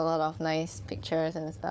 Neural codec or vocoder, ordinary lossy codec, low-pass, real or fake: codec, 16 kHz, 4 kbps, FunCodec, trained on Chinese and English, 50 frames a second; none; none; fake